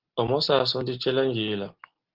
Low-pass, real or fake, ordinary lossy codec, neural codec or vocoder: 5.4 kHz; real; Opus, 16 kbps; none